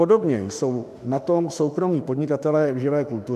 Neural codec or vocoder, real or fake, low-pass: autoencoder, 48 kHz, 32 numbers a frame, DAC-VAE, trained on Japanese speech; fake; 14.4 kHz